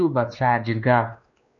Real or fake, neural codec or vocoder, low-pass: fake; codec, 16 kHz, 2 kbps, X-Codec, WavLM features, trained on Multilingual LibriSpeech; 7.2 kHz